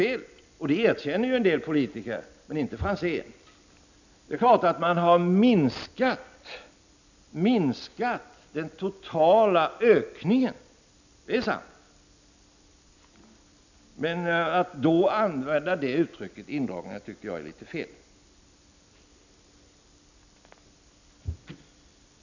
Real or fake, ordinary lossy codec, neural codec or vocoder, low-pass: real; none; none; 7.2 kHz